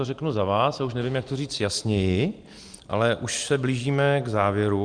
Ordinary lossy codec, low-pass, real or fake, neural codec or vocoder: Opus, 32 kbps; 9.9 kHz; real; none